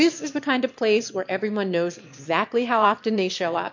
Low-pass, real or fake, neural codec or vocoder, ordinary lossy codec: 7.2 kHz; fake; autoencoder, 22.05 kHz, a latent of 192 numbers a frame, VITS, trained on one speaker; MP3, 48 kbps